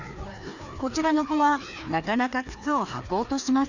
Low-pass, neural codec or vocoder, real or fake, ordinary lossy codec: 7.2 kHz; codec, 16 kHz, 2 kbps, FreqCodec, larger model; fake; none